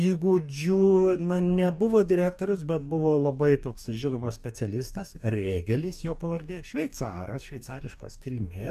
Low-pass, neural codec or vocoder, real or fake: 14.4 kHz; codec, 44.1 kHz, 2.6 kbps, DAC; fake